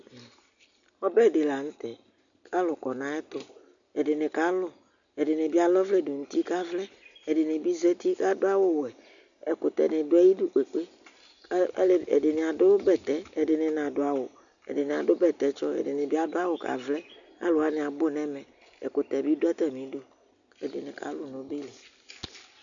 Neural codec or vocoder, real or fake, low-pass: none; real; 7.2 kHz